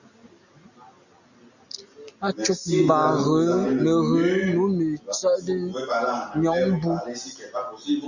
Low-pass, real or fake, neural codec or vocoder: 7.2 kHz; real; none